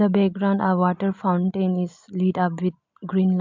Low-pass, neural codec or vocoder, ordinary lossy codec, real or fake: 7.2 kHz; none; MP3, 64 kbps; real